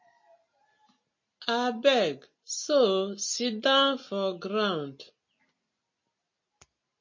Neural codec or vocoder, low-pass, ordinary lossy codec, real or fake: none; 7.2 kHz; MP3, 32 kbps; real